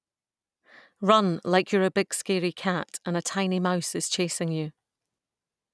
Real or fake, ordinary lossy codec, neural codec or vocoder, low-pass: real; none; none; none